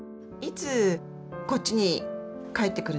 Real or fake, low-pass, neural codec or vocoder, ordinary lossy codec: real; none; none; none